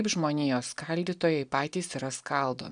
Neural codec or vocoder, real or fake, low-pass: none; real; 9.9 kHz